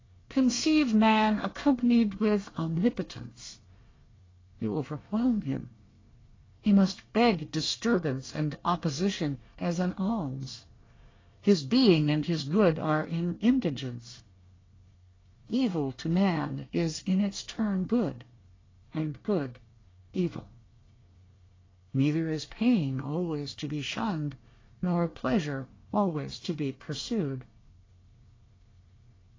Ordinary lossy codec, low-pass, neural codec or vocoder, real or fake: AAC, 32 kbps; 7.2 kHz; codec, 24 kHz, 1 kbps, SNAC; fake